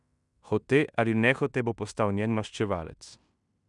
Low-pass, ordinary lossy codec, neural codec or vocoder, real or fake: 10.8 kHz; none; codec, 16 kHz in and 24 kHz out, 0.9 kbps, LongCat-Audio-Codec, fine tuned four codebook decoder; fake